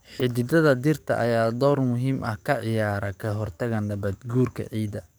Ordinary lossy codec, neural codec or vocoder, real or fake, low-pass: none; codec, 44.1 kHz, 7.8 kbps, DAC; fake; none